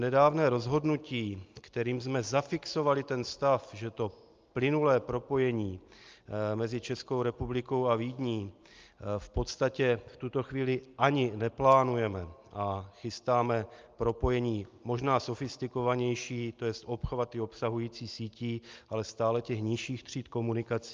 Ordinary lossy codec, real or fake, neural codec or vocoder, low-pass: Opus, 24 kbps; real; none; 7.2 kHz